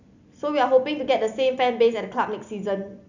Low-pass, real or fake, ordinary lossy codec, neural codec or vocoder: 7.2 kHz; real; none; none